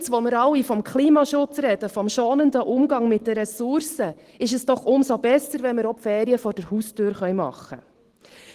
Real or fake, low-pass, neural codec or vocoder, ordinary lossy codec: real; 14.4 kHz; none; Opus, 16 kbps